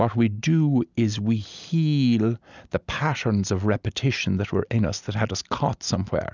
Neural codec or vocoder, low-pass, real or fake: none; 7.2 kHz; real